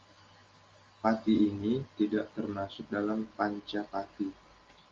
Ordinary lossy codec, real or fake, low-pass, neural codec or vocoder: Opus, 32 kbps; real; 7.2 kHz; none